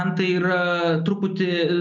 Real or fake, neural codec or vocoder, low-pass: real; none; 7.2 kHz